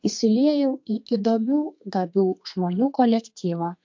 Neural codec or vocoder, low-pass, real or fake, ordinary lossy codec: codec, 32 kHz, 1.9 kbps, SNAC; 7.2 kHz; fake; MP3, 48 kbps